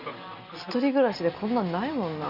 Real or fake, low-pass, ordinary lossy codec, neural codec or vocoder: real; 5.4 kHz; none; none